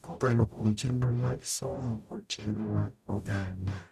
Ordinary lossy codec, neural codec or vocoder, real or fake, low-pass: none; codec, 44.1 kHz, 0.9 kbps, DAC; fake; 14.4 kHz